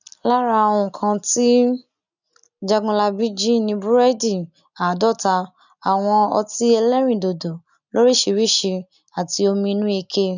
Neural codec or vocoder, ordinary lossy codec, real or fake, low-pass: none; none; real; 7.2 kHz